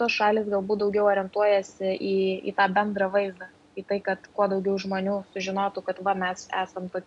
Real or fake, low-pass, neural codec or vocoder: real; 10.8 kHz; none